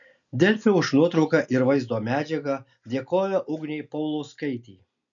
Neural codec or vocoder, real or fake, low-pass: none; real; 7.2 kHz